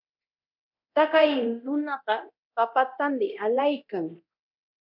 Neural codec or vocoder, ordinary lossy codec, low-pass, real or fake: codec, 24 kHz, 0.9 kbps, DualCodec; MP3, 48 kbps; 5.4 kHz; fake